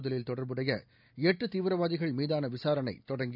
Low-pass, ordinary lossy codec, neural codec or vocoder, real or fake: 5.4 kHz; none; none; real